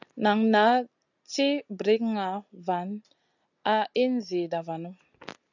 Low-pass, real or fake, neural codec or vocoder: 7.2 kHz; real; none